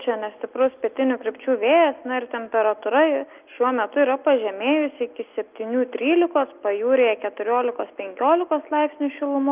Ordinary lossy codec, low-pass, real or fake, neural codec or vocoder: Opus, 24 kbps; 3.6 kHz; real; none